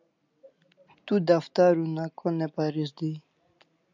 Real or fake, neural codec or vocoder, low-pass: real; none; 7.2 kHz